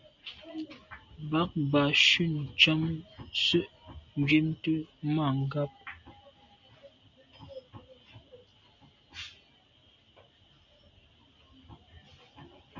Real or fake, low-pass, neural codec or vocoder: real; 7.2 kHz; none